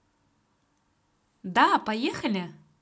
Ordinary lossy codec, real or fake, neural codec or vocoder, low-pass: none; real; none; none